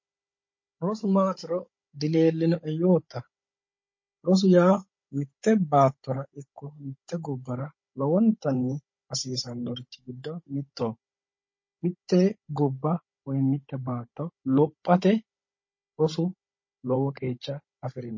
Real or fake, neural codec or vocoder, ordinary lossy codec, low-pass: fake; codec, 16 kHz, 16 kbps, FunCodec, trained on Chinese and English, 50 frames a second; MP3, 32 kbps; 7.2 kHz